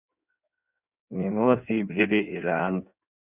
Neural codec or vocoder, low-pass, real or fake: codec, 16 kHz in and 24 kHz out, 1.1 kbps, FireRedTTS-2 codec; 3.6 kHz; fake